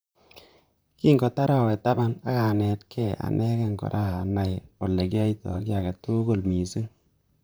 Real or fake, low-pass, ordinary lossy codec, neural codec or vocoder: fake; none; none; vocoder, 44.1 kHz, 128 mel bands, Pupu-Vocoder